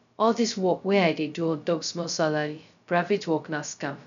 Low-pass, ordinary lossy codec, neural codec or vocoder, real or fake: 7.2 kHz; none; codec, 16 kHz, 0.2 kbps, FocalCodec; fake